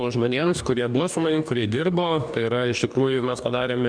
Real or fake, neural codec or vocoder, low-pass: fake; codec, 44.1 kHz, 2.6 kbps, DAC; 9.9 kHz